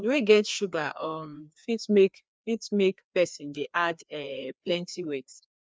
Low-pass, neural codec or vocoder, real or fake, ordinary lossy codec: none; codec, 16 kHz, 2 kbps, FreqCodec, larger model; fake; none